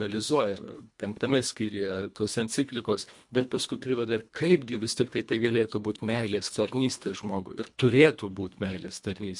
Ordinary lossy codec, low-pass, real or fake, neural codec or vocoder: MP3, 64 kbps; 10.8 kHz; fake; codec, 24 kHz, 1.5 kbps, HILCodec